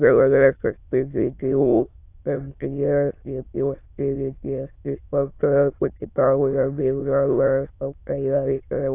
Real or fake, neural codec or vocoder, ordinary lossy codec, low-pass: fake; autoencoder, 22.05 kHz, a latent of 192 numbers a frame, VITS, trained on many speakers; AAC, 24 kbps; 3.6 kHz